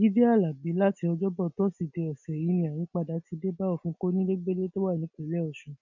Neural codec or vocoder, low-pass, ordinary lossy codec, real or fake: none; 7.2 kHz; none; real